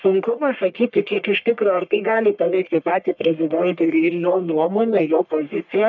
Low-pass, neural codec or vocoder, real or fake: 7.2 kHz; codec, 44.1 kHz, 1.7 kbps, Pupu-Codec; fake